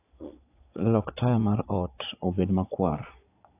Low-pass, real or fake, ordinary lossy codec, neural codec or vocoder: 3.6 kHz; fake; AAC, 24 kbps; vocoder, 22.05 kHz, 80 mel bands, Vocos